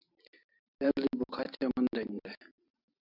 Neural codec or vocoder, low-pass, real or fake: none; 5.4 kHz; real